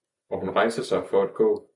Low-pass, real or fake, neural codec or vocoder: 10.8 kHz; real; none